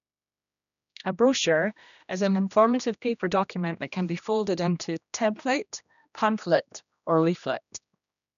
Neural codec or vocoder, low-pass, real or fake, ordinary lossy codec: codec, 16 kHz, 1 kbps, X-Codec, HuBERT features, trained on general audio; 7.2 kHz; fake; none